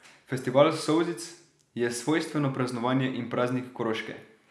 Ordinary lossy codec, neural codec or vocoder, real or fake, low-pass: none; none; real; none